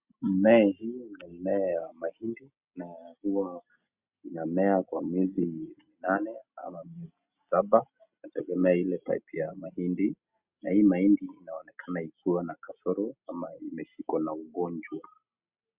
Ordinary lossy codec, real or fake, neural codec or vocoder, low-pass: Opus, 64 kbps; real; none; 3.6 kHz